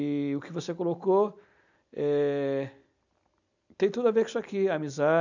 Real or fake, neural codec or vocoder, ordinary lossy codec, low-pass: real; none; none; 7.2 kHz